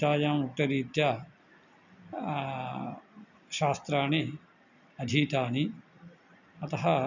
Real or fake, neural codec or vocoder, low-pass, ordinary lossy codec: real; none; 7.2 kHz; none